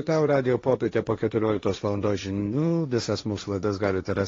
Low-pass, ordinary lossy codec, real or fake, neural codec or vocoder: 7.2 kHz; AAC, 32 kbps; fake; codec, 16 kHz, 1.1 kbps, Voila-Tokenizer